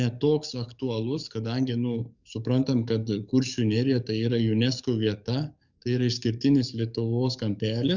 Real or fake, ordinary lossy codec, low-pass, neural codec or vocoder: fake; Opus, 64 kbps; 7.2 kHz; codec, 44.1 kHz, 7.8 kbps, DAC